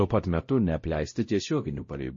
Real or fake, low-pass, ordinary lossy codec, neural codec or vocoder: fake; 7.2 kHz; MP3, 32 kbps; codec, 16 kHz, 0.5 kbps, X-Codec, WavLM features, trained on Multilingual LibriSpeech